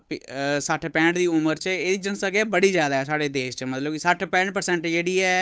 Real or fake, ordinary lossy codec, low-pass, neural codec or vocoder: fake; none; none; codec, 16 kHz, 8 kbps, FunCodec, trained on Chinese and English, 25 frames a second